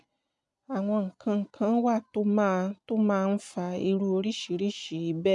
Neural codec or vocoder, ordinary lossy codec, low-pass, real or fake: none; MP3, 96 kbps; 10.8 kHz; real